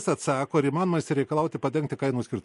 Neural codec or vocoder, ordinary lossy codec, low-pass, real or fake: none; MP3, 48 kbps; 14.4 kHz; real